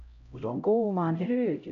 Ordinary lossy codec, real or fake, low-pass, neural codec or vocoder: none; fake; 7.2 kHz; codec, 16 kHz, 0.5 kbps, X-Codec, HuBERT features, trained on LibriSpeech